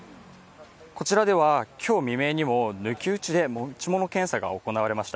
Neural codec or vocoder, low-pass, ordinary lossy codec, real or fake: none; none; none; real